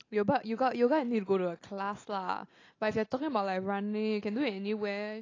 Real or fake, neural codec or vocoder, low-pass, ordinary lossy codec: real; none; 7.2 kHz; AAC, 32 kbps